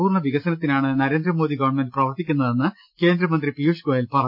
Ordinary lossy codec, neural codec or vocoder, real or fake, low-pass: MP3, 48 kbps; none; real; 5.4 kHz